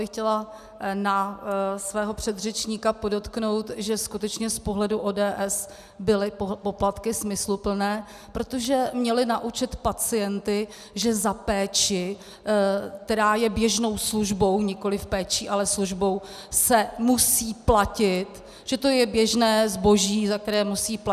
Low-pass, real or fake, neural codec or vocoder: 14.4 kHz; real; none